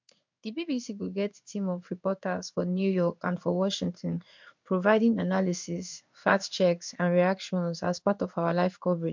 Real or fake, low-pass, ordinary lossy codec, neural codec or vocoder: fake; 7.2 kHz; MP3, 64 kbps; codec, 16 kHz in and 24 kHz out, 1 kbps, XY-Tokenizer